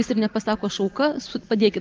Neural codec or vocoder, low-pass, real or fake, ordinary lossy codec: none; 7.2 kHz; real; Opus, 32 kbps